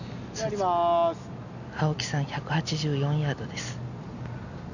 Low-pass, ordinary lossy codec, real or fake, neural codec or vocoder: 7.2 kHz; none; real; none